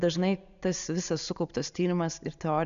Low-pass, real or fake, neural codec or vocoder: 7.2 kHz; real; none